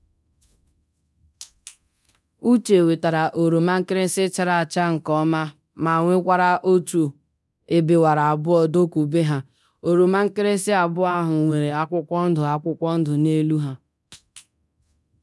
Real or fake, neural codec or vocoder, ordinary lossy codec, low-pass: fake; codec, 24 kHz, 0.9 kbps, DualCodec; none; none